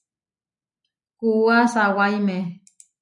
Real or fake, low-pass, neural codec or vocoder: real; 10.8 kHz; none